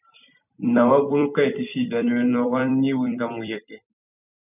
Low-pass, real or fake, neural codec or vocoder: 3.6 kHz; fake; vocoder, 44.1 kHz, 128 mel bands every 256 samples, BigVGAN v2